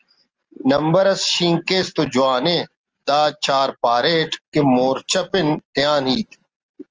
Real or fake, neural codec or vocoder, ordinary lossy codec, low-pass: real; none; Opus, 24 kbps; 7.2 kHz